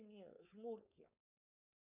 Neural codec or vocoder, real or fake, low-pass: codec, 16 kHz, 4.8 kbps, FACodec; fake; 3.6 kHz